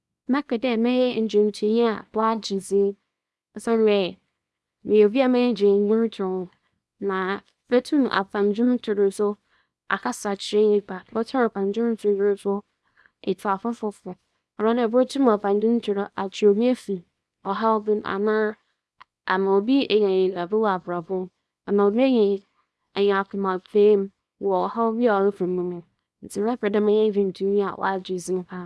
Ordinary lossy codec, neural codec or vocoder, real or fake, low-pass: none; codec, 24 kHz, 0.9 kbps, WavTokenizer, small release; fake; none